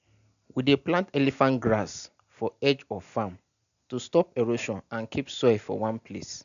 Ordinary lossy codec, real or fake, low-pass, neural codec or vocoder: none; real; 7.2 kHz; none